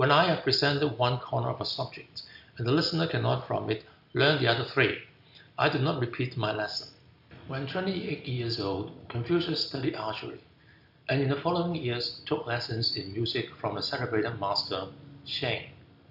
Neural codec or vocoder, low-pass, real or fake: none; 5.4 kHz; real